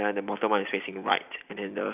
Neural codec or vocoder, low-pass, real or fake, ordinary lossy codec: none; 3.6 kHz; real; none